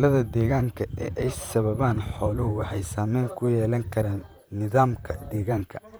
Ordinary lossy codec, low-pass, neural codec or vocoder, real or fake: none; none; vocoder, 44.1 kHz, 128 mel bands, Pupu-Vocoder; fake